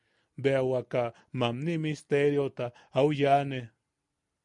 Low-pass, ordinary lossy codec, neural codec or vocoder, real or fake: 10.8 kHz; MP3, 64 kbps; none; real